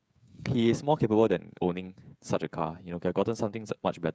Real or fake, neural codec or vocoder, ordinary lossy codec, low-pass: fake; codec, 16 kHz, 16 kbps, FreqCodec, smaller model; none; none